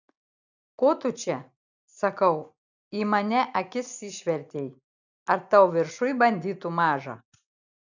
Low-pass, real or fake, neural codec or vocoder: 7.2 kHz; real; none